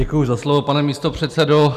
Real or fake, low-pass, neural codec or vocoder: real; 14.4 kHz; none